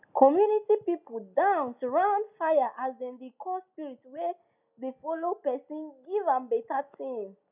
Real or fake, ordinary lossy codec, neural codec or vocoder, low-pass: real; none; none; 3.6 kHz